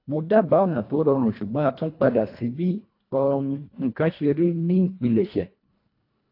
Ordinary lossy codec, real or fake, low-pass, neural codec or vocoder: AAC, 32 kbps; fake; 5.4 kHz; codec, 24 kHz, 1.5 kbps, HILCodec